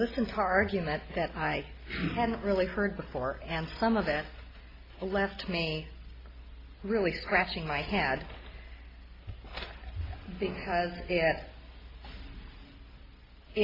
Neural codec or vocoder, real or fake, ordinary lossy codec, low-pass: none; real; AAC, 24 kbps; 5.4 kHz